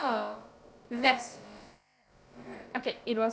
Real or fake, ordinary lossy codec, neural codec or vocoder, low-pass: fake; none; codec, 16 kHz, about 1 kbps, DyCAST, with the encoder's durations; none